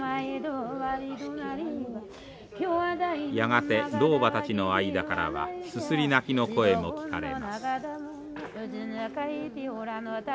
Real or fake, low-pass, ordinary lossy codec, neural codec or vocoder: real; none; none; none